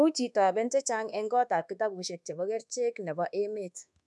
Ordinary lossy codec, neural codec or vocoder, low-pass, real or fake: none; codec, 24 kHz, 1.2 kbps, DualCodec; none; fake